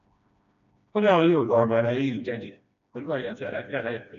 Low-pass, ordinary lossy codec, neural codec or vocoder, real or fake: 7.2 kHz; AAC, 96 kbps; codec, 16 kHz, 1 kbps, FreqCodec, smaller model; fake